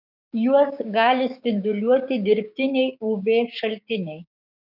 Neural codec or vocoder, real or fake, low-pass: codec, 16 kHz, 6 kbps, DAC; fake; 5.4 kHz